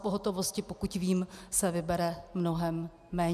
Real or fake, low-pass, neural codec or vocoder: real; 14.4 kHz; none